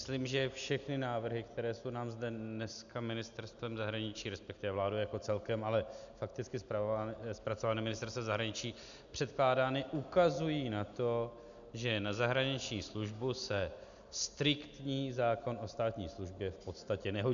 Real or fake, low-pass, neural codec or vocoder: real; 7.2 kHz; none